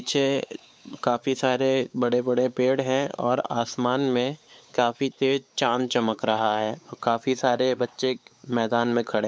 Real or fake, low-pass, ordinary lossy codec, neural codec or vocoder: fake; none; none; codec, 16 kHz, 4 kbps, X-Codec, WavLM features, trained on Multilingual LibriSpeech